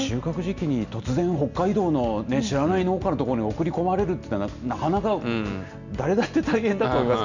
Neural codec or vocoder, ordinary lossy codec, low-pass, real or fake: none; none; 7.2 kHz; real